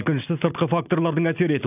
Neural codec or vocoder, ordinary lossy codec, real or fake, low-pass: none; none; real; 3.6 kHz